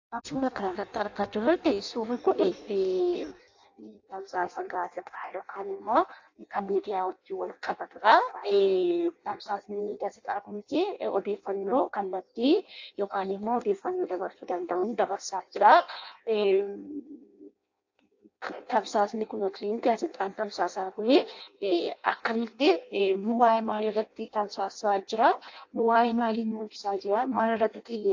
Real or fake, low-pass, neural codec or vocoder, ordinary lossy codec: fake; 7.2 kHz; codec, 16 kHz in and 24 kHz out, 0.6 kbps, FireRedTTS-2 codec; AAC, 48 kbps